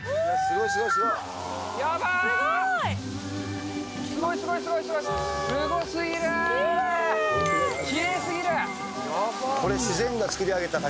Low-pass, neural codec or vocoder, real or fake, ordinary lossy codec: none; none; real; none